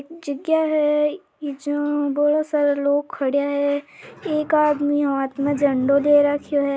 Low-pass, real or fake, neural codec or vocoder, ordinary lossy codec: none; real; none; none